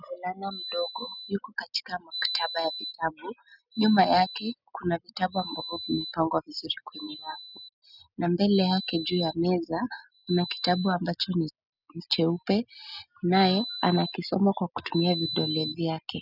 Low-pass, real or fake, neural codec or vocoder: 5.4 kHz; real; none